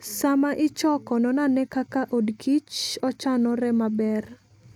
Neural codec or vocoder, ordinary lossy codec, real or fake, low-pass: none; none; real; 19.8 kHz